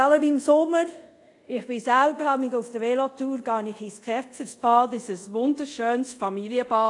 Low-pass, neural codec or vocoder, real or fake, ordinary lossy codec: 10.8 kHz; codec, 24 kHz, 0.5 kbps, DualCodec; fake; AAC, 48 kbps